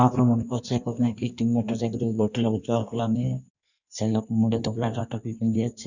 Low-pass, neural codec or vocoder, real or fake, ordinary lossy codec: 7.2 kHz; codec, 16 kHz in and 24 kHz out, 1.1 kbps, FireRedTTS-2 codec; fake; none